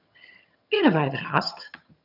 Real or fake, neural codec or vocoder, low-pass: fake; vocoder, 22.05 kHz, 80 mel bands, HiFi-GAN; 5.4 kHz